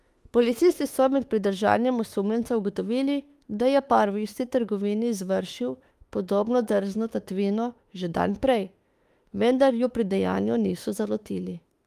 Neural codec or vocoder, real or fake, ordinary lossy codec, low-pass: autoencoder, 48 kHz, 32 numbers a frame, DAC-VAE, trained on Japanese speech; fake; Opus, 32 kbps; 14.4 kHz